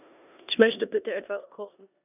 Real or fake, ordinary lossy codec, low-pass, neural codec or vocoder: fake; none; 3.6 kHz; codec, 16 kHz in and 24 kHz out, 0.9 kbps, LongCat-Audio-Codec, four codebook decoder